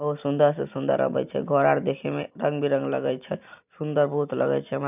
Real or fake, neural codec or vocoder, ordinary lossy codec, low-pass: real; none; none; 3.6 kHz